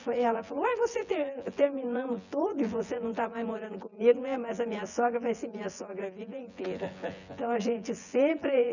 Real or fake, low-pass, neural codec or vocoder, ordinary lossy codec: fake; 7.2 kHz; vocoder, 24 kHz, 100 mel bands, Vocos; none